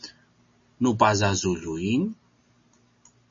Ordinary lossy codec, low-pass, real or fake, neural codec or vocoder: MP3, 32 kbps; 7.2 kHz; real; none